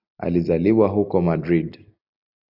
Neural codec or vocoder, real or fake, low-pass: none; real; 5.4 kHz